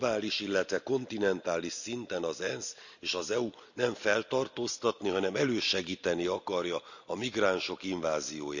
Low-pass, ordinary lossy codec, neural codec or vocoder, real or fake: 7.2 kHz; none; none; real